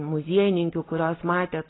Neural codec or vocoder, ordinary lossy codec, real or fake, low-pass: none; AAC, 16 kbps; real; 7.2 kHz